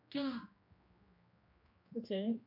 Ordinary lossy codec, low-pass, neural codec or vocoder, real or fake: AAC, 32 kbps; 5.4 kHz; codec, 16 kHz, 1 kbps, X-Codec, HuBERT features, trained on general audio; fake